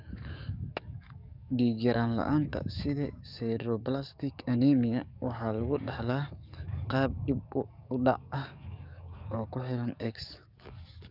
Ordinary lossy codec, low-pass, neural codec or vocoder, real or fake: none; 5.4 kHz; codec, 44.1 kHz, 7.8 kbps, DAC; fake